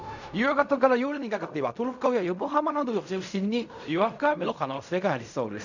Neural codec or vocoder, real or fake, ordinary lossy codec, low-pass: codec, 16 kHz in and 24 kHz out, 0.4 kbps, LongCat-Audio-Codec, fine tuned four codebook decoder; fake; none; 7.2 kHz